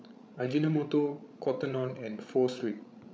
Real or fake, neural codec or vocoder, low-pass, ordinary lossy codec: fake; codec, 16 kHz, 16 kbps, FreqCodec, larger model; none; none